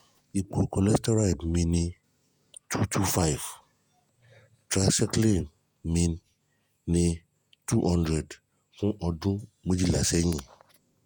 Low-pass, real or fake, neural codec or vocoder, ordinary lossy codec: none; fake; vocoder, 48 kHz, 128 mel bands, Vocos; none